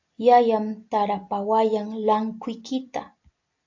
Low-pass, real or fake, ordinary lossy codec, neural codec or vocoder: 7.2 kHz; real; AAC, 48 kbps; none